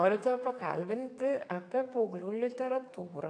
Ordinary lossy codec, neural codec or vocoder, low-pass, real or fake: AAC, 48 kbps; codec, 32 kHz, 1.9 kbps, SNAC; 9.9 kHz; fake